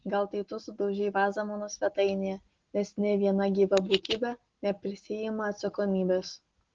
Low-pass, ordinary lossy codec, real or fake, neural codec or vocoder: 7.2 kHz; Opus, 16 kbps; real; none